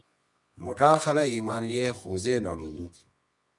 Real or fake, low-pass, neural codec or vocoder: fake; 10.8 kHz; codec, 24 kHz, 0.9 kbps, WavTokenizer, medium music audio release